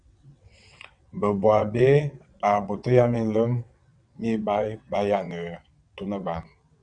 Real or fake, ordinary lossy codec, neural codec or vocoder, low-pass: fake; MP3, 96 kbps; vocoder, 22.05 kHz, 80 mel bands, WaveNeXt; 9.9 kHz